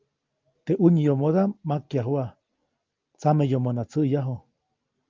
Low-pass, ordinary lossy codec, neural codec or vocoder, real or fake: 7.2 kHz; Opus, 24 kbps; none; real